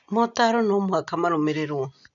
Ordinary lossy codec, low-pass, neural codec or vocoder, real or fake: none; 7.2 kHz; none; real